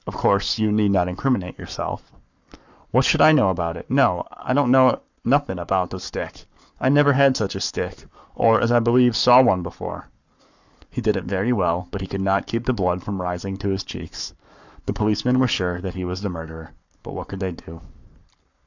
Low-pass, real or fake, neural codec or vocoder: 7.2 kHz; fake; codec, 44.1 kHz, 7.8 kbps, Pupu-Codec